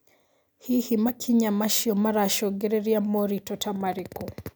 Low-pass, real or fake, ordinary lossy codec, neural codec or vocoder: none; real; none; none